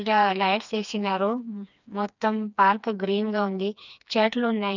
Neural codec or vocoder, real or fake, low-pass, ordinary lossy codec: codec, 16 kHz, 2 kbps, FreqCodec, smaller model; fake; 7.2 kHz; none